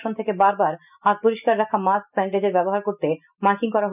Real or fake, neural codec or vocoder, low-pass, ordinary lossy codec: real; none; 3.6 kHz; none